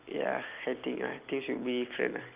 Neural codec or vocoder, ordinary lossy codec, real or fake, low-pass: none; Opus, 24 kbps; real; 3.6 kHz